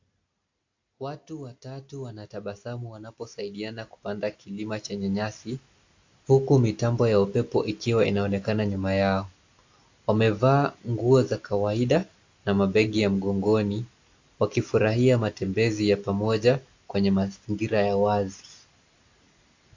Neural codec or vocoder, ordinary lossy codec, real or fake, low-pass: none; AAC, 48 kbps; real; 7.2 kHz